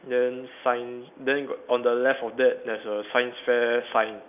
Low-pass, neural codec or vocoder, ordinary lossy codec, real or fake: 3.6 kHz; none; none; real